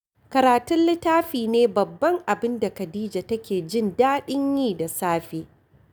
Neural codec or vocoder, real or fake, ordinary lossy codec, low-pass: none; real; none; none